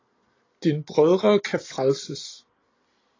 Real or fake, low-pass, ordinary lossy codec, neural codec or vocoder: real; 7.2 kHz; AAC, 32 kbps; none